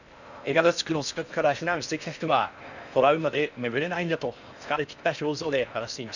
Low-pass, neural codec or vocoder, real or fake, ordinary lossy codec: 7.2 kHz; codec, 16 kHz in and 24 kHz out, 0.6 kbps, FocalCodec, streaming, 4096 codes; fake; none